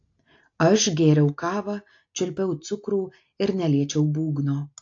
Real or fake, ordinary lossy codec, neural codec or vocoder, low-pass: real; AAC, 48 kbps; none; 7.2 kHz